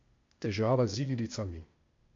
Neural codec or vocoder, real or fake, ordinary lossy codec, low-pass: codec, 16 kHz, 0.8 kbps, ZipCodec; fake; MP3, 48 kbps; 7.2 kHz